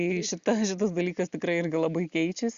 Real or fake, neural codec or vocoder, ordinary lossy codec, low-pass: real; none; Opus, 64 kbps; 7.2 kHz